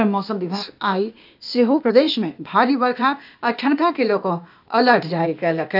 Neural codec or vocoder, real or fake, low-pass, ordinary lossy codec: codec, 16 kHz, 0.8 kbps, ZipCodec; fake; 5.4 kHz; none